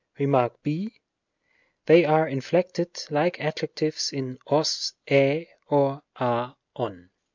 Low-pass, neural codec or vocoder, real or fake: 7.2 kHz; none; real